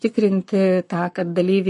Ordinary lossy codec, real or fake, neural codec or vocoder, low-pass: MP3, 48 kbps; fake; codec, 44.1 kHz, 7.8 kbps, Pupu-Codec; 14.4 kHz